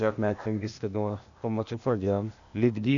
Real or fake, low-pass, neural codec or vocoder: fake; 7.2 kHz; codec, 16 kHz, 0.8 kbps, ZipCodec